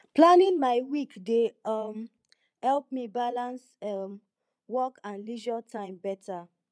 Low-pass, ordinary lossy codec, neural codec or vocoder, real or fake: none; none; vocoder, 22.05 kHz, 80 mel bands, Vocos; fake